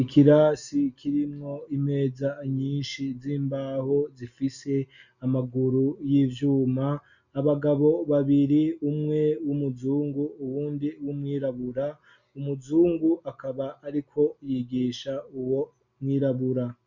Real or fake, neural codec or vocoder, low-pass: real; none; 7.2 kHz